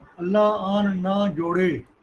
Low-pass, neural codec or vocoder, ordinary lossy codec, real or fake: 10.8 kHz; none; Opus, 16 kbps; real